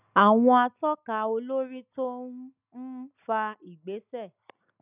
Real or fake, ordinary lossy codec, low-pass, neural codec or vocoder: real; none; 3.6 kHz; none